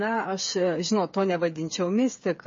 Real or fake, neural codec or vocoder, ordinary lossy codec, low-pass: fake; codec, 16 kHz, 8 kbps, FreqCodec, smaller model; MP3, 32 kbps; 7.2 kHz